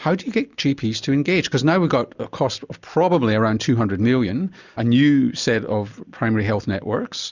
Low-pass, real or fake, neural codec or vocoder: 7.2 kHz; real; none